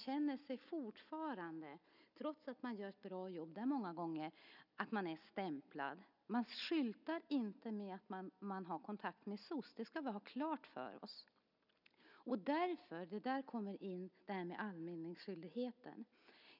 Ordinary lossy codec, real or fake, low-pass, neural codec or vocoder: none; real; 5.4 kHz; none